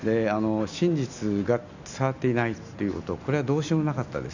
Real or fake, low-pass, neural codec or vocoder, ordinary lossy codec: real; 7.2 kHz; none; none